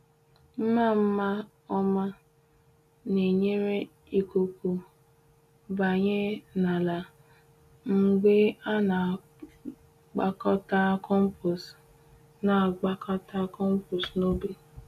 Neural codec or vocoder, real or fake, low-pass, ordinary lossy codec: none; real; 14.4 kHz; none